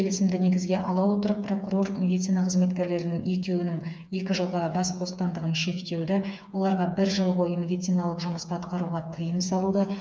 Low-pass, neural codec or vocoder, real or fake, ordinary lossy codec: none; codec, 16 kHz, 4 kbps, FreqCodec, smaller model; fake; none